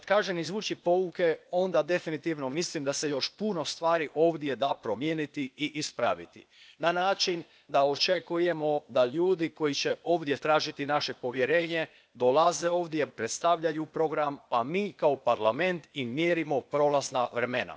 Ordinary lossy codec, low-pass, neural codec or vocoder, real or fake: none; none; codec, 16 kHz, 0.8 kbps, ZipCodec; fake